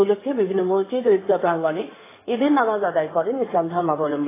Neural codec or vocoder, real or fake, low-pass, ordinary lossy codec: codec, 16 kHz in and 24 kHz out, 2.2 kbps, FireRedTTS-2 codec; fake; 3.6 kHz; AAC, 16 kbps